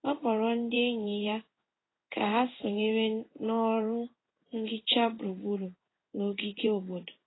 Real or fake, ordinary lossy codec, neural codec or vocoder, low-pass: fake; AAC, 16 kbps; codec, 16 kHz in and 24 kHz out, 1 kbps, XY-Tokenizer; 7.2 kHz